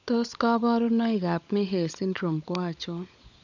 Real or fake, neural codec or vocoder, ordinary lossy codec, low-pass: fake; codec, 16 kHz, 16 kbps, FunCodec, trained on LibriTTS, 50 frames a second; none; 7.2 kHz